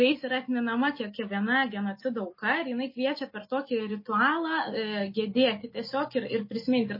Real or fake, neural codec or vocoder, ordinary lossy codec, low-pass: real; none; MP3, 24 kbps; 5.4 kHz